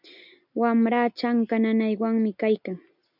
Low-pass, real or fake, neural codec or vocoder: 5.4 kHz; real; none